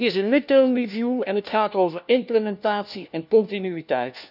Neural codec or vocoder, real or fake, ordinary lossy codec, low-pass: codec, 16 kHz, 1 kbps, FunCodec, trained on LibriTTS, 50 frames a second; fake; none; 5.4 kHz